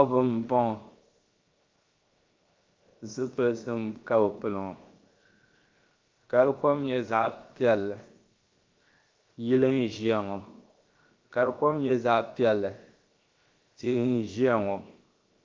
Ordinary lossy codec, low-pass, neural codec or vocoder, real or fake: Opus, 24 kbps; 7.2 kHz; codec, 16 kHz, 0.7 kbps, FocalCodec; fake